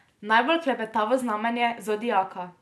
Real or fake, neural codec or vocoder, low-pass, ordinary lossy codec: real; none; none; none